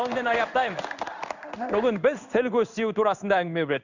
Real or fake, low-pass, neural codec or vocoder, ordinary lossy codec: fake; 7.2 kHz; codec, 16 kHz in and 24 kHz out, 1 kbps, XY-Tokenizer; none